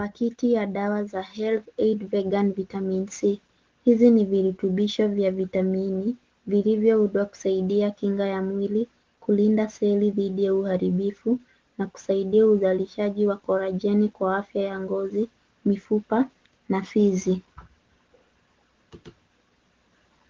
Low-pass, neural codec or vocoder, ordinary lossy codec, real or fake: 7.2 kHz; none; Opus, 16 kbps; real